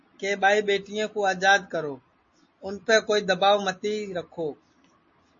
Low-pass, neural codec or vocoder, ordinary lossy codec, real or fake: 7.2 kHz; none; MP3, 32 kbps; real